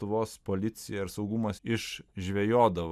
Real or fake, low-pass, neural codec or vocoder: real; 14.4 kHz; none